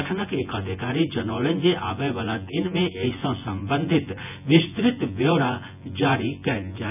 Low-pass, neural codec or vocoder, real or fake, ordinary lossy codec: 3.6 kHz; vocoder, 24 kHz, 100 mel bands, Vocos; fake; none